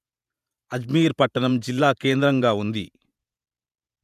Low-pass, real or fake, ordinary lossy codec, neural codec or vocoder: 14.4 kHz; fake; none; vocoder, 48 kHz, 128 mel bands, Vocos